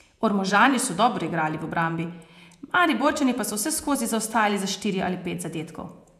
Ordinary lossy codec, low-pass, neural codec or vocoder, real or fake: none; 14.4 kHz; vocoder, 48 kHz, 128 mel bands, Vocos; fake